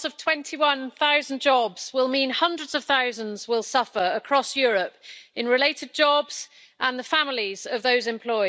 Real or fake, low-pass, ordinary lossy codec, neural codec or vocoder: real; none; none; none